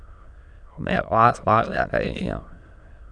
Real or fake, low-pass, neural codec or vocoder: fake; 9.9 kHz; autoencoder, 22.05 kHz, a latent of 192 numbers a frame, VITS, trained on many speakers